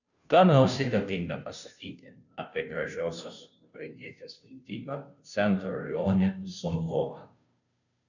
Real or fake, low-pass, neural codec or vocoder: fake; 7.2 kHz; codec, 16 kHz, 0.5 kbps, FunCodec, trained on Chinese and English, 25 frames a second